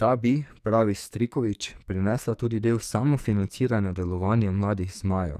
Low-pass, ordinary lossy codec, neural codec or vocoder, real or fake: 14.4 kHz; none; codec, 44.1 kHz, 2.6 kbps, SNAC; fake